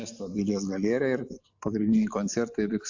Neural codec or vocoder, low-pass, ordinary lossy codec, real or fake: none; 7.2 kHz; AAC, 48 kbps; real